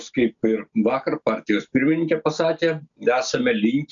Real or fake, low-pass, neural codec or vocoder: real; 7.2 kHz; none